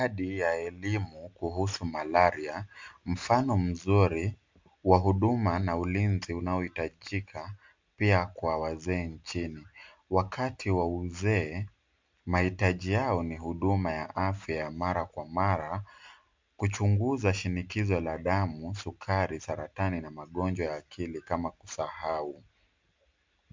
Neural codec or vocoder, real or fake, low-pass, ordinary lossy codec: none; real; 7.2 kHz; MP3, 64 kbps